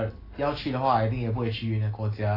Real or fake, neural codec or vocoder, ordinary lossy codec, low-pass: real; none; AAC, 24 kbps; 5.4 kHz